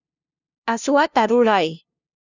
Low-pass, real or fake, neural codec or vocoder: 7.2 kHz; fake; codec, 16 kHz, 0.5 kbps, FunCodec, trained on LibriTTS, 25 frames a second